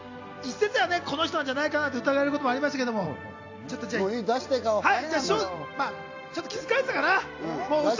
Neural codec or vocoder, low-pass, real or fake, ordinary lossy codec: none; 7.2 kHz; real; AAC, 48 kbps